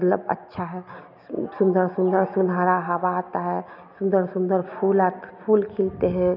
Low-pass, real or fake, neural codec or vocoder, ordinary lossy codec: 5.4 kHz; real; none; none